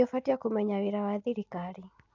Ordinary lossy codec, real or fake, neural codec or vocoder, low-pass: AAC, 48 kbps; real; none; 7.2 kHz